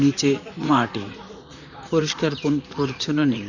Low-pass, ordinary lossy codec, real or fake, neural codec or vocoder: 7.2 kHz; none; fake; vocoder, 44.1 kHz, 128 mel bands, Pupu-Vocoder